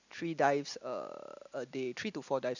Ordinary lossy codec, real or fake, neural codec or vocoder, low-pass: none; real; none; 7.2 kHz